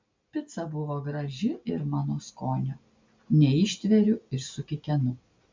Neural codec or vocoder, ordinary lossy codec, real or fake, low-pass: none; MP3, 64 kbps; real; 7.2 kHz